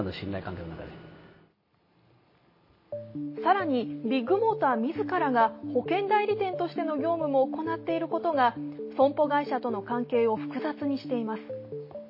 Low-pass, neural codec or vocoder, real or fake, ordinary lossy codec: 5.4 kHz; none; real; MP3, 24 kbps